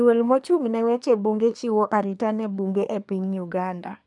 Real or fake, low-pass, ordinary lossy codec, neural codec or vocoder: fake; 10.8 kHz; none; codec, 24 kHz, 1 kbps, SNAC